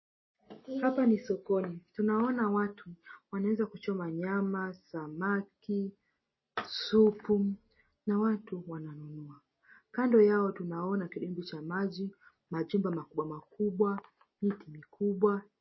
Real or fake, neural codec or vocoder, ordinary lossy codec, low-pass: real; none; MP3, 24 kbps; 7.2 kHz